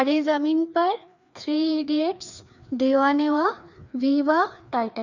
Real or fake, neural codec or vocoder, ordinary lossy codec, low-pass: fake; codec, 16 kHz, 2 kbps, FreqCodec, larger model; none; 7.2 kHz